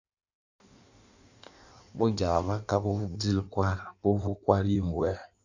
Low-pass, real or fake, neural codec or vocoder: 7.2 kHz; fake; codec, 16 kHz in and 24 kHz out, 1.1 kbps, FireRedTTS-2 codec